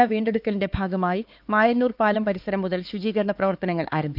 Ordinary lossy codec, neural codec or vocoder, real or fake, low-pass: Opus, 24 kbps; codec, 16 kHz, 4 kbps, X-Codec, HuBERT features, trained on LibriSpeech; fake; 5.4 kHz